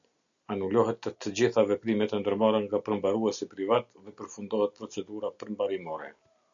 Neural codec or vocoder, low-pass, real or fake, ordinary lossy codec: none; 7.2 kHz; real; MP3, 96 kbps